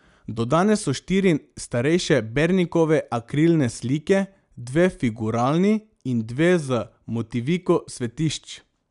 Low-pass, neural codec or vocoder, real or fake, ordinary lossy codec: 10.8 kHz; none; real; none